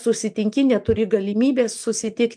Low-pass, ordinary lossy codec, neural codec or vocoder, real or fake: 9.9 kHz; MP3, 64 kbps; none; real